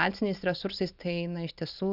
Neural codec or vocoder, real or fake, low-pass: none; real; 5.4 kHz